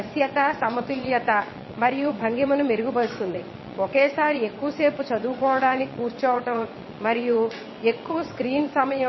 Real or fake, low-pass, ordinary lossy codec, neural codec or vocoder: fake; 7.2 kHz; MP3, 24 kbps; vocoder, 22.05 kHz, 80 mel bands, WaveNeXt